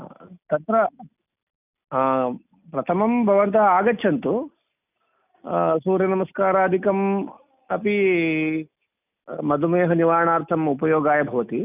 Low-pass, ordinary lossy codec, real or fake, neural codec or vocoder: 3.6 kHz; none; real; none